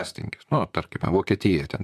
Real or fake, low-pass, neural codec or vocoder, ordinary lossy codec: fake; 14.4 kHz; vocoder, 44.1 kHz, 128 mel bands, Pupu-Vocoder; MP3, 96 kbps